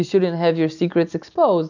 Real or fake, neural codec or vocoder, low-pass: real; none; 7.2 kHz